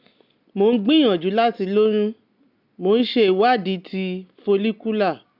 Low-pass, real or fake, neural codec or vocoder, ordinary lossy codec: 5.4 kHz; real; none; none